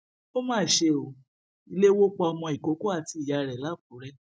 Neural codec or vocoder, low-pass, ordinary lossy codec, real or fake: none; none; none; real